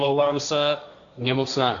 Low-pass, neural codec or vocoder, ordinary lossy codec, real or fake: 7.2 kHz; codec, 16 kHz, 1.1 kbps, Voila-Tokenizer; MP3, 96 kbps; fake